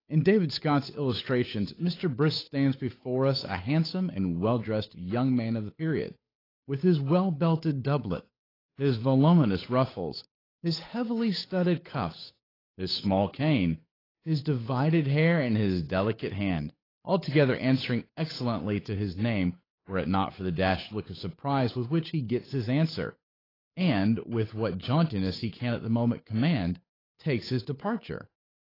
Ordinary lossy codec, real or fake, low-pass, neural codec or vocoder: AAC, 24 kbps; fake; 5.4 kHz; codec, 16 kHz, 8 kbps, FunCodec, trained on Chinese and English, 25 frames a second